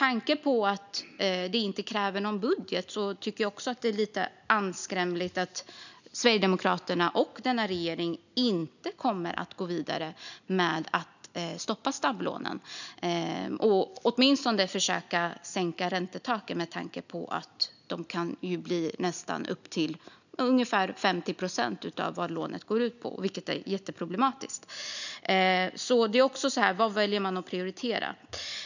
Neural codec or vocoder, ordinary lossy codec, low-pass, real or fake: none; none; 7.2 kHz; real